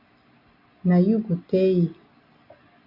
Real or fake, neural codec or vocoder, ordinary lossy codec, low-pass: real; none; AAC, 32 kbps; 5.4 kHz